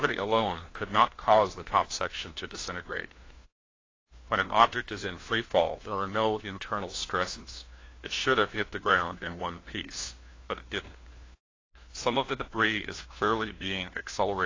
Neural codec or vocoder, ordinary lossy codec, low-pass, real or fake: codec, 16 kHz, 1 kbps, FunCodec, trained on LibriTTS, 50 frames a second; AAC, 32 kbps; 7.2 kHz; fake